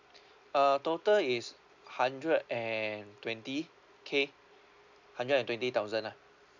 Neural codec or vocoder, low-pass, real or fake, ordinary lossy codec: none; 7.2 kHz; real; none